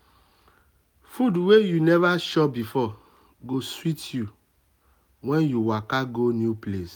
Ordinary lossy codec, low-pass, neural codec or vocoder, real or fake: none; none; none; real